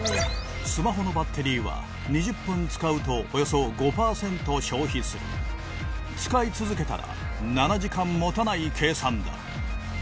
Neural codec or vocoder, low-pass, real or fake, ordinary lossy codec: none; none; real; none